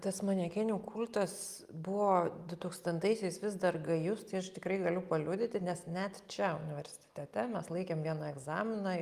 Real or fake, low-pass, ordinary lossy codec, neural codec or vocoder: real; 14.4 kHz; Opus, 32 kbps; none